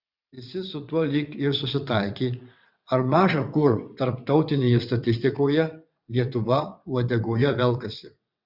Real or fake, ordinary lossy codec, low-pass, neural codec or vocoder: fake; Opus, 64 kbps; 5.4 kHz; vocoder, 22.05 kHz, 80 mel bands, WaveNeXt